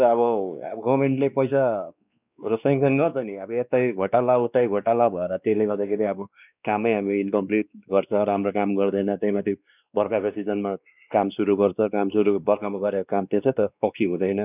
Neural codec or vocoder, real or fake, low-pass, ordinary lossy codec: codec, 16 kHz, 2 kbps, X-Codec, WavLM features, trained on Multilingual LibriSpeech; fake; 3.6 kHz; none